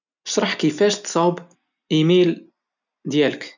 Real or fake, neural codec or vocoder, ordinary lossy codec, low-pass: real; none; none; 7.2 kHz